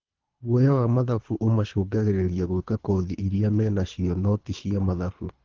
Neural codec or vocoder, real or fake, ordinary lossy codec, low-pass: codec, 24 kHz, 3 kbps, HILCodec; fake; Opus, 16 kbps; 7.2 kHz